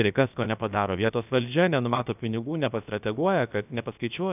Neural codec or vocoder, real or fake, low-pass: codec, 16 kHz, about 1 kbps, DyCAST, with the encoder's durations; fake; 3.6 kHz